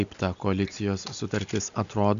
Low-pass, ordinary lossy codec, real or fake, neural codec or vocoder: 7.2 kHz; AAC, 96 kbps; real; none